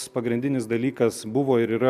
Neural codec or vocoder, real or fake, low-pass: none; real; 14.4 kHz